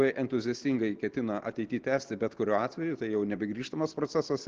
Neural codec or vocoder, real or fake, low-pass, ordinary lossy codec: none; real; 7.2 kHz; Opus, 16 kbps